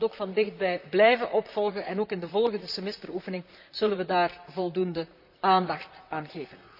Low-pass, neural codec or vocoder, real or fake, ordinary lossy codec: 5.4 kHz; vocoder, 44.1 kHz, 128 mel bands, Pupu-Vocoder; fake; none